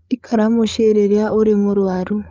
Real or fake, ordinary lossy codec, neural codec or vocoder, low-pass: fake; Opus, 24 kbps; codec, 16 kHz, 8 kbps, FreqCodec, larger model; 7.2 kHz